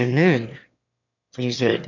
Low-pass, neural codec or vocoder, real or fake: 7.2 kHz; autoencoder, 22.05 kHz, a latent of 192 numbers a frame, VITS, trained on one speaker; fake